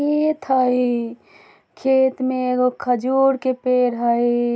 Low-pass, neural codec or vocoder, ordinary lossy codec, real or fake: none; none; none; real